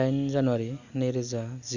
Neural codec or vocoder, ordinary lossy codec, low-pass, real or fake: none; none; 7.2 kHz; real